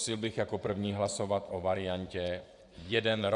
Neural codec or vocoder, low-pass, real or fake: vocoder, 24 kHz, 100 mel bands, Vocos; 10.8 kHz; fake